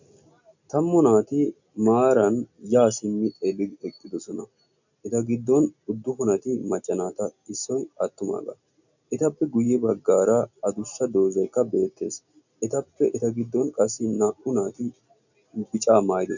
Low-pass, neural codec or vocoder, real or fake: 7.2 kHz; none; real